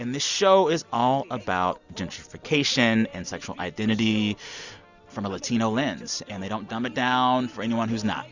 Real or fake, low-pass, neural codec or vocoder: real; 7.2 kHz; none